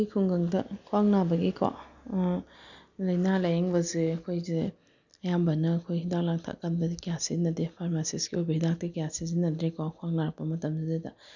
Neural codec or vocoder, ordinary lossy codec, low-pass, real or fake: none; none; 7.2 kHz; real